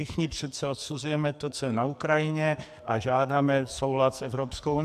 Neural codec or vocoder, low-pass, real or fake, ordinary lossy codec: codec, 44.1 kHz, 2.6 kbps, SNAC; 14.4 kHz; fake; AAC, 96 kbps